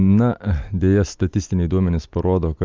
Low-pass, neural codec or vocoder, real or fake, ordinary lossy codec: 7.2 kHz; none; real; Opus, 24 kbps